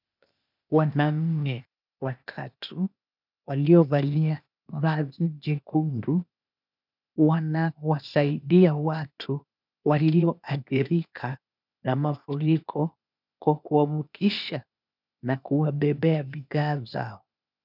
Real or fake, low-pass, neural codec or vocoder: fake; 5.4 kHz; codec, 16 kHz, 0.8 kbps, ZipCodec